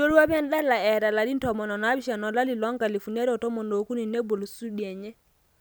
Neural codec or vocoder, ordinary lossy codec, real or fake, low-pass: none; none; real; none